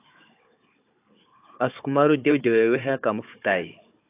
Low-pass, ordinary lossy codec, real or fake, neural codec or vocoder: 3.6 kHz; AAC, 24 kbps; fake; codec, 16 kHz, 4 kbps, FunCodec, trained on Chinese and English, 50 frames a second